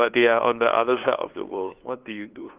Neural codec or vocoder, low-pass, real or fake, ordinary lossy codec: codec, 16 kHz, 2 kbps, FunCodec, trained on LibriTTS, 25 frames a second; 3.6 kHz; fake; Opus, 32 kbps